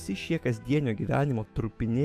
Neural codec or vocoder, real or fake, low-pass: autoencoder, 48 kHz, 128 numbers a frame, DAC-VAE, trained on Japanese speech; fake; 14.4 kHz